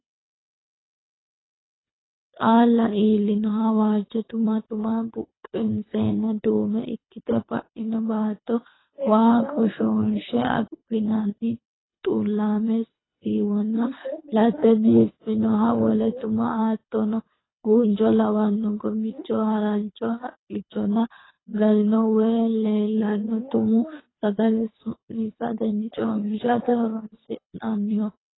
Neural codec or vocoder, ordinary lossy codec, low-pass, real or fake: codec, 24 kHz, 3 kbps, HILCodec; AAC, 16 kbps; 7.2 kHz; fake